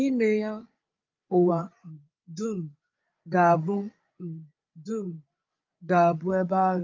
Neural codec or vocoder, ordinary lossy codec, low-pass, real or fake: codec, 16 kHz, 8 kbps, FreqCodec, larger model; Opus, 24 kbps; 7.2 kHz; fake